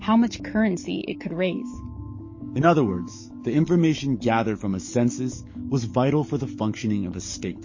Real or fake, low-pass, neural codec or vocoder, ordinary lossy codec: fake; 7.2 kHz; codec, 44.1 kHz, 7.8 kbps, DAC; MP3, 32 kbps